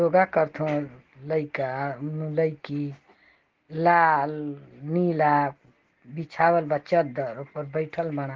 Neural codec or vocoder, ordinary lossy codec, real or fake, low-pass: none; Opus, 16 kbps; real; 7.2 kHz